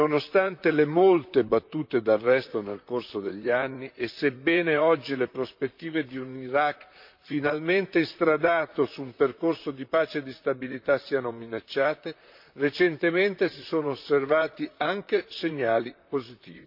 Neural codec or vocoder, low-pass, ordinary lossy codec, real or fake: vocoder, 44.1 kHz, 128 mel bands, Pupu-Vocoder; 5.4 kHz; MP3, 48 kbps; fake